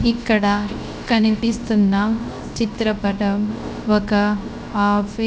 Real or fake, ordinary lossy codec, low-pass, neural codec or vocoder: fake; none; none; codec, 16 kHz, 0.3 kbps, FocalCodec